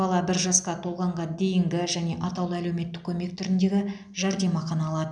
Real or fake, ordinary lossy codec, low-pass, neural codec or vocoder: real; none; 9.9 kHz; none